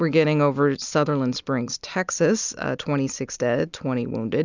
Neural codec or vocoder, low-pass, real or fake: none; 7.2 kHz; real